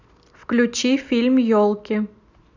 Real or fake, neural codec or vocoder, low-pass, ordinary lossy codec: real; none; 7.2 kHz; none